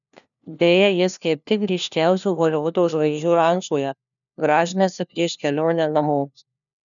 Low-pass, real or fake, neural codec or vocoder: 7.2 kHz; fake; codec, 16 kHz, 1 kbps, FunCodec, trained on LibriTTS, 50 frames a second